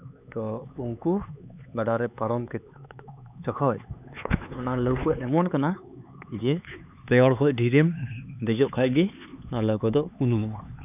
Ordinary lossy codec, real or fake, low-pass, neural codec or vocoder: none; fake; 3.6 kHz; codec, 16 kHz, 4 kbps, X-Codec, HuBERT features, trained on LibriSpeech